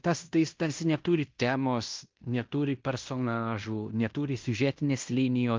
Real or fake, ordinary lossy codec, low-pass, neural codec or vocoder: fake; Opus, 32 kbps; 7.2 kHz; codec, 16 kHz in and 24 kHz out, 0.9 kbps, LongCat-Audio-Codec, fine tuned four codebook decoder